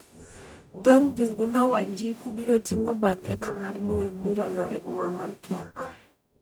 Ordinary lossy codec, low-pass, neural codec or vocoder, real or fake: none; none; codec, 44.1 kHz, 0.9 kbps, DAC; fake